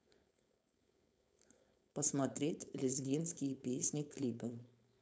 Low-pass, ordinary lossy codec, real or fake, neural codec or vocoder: none; none; fake; codec, 16 kHz, 4.8 kbps, FACodec